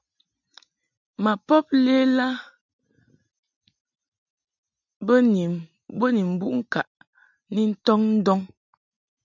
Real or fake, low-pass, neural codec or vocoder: real; 7.2 kHz; none